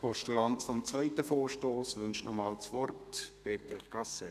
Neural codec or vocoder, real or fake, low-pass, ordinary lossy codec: codec, 32 kHz, 1.9 kbps, SNAC; fake; 14.4 kHz; none